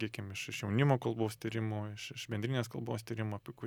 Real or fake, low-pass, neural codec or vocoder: real; 19.8 kHz; none